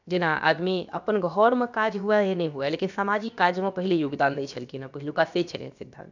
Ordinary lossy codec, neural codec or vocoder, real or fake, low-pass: none; codec, 16 kHz, about 1 kbps, DyCAST, with the encoder's durations; fake; 7.2 kHz